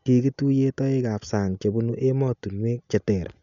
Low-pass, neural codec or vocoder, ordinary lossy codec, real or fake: 7.2 kHz; none; none; real